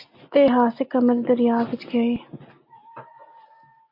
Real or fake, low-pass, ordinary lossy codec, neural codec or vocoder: real; 5.4 kHz; MP3, 32 kbps; none